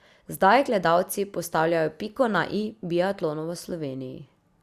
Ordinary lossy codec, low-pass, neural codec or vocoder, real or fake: Opus, 64 kbps; 14.4 kHz; none; real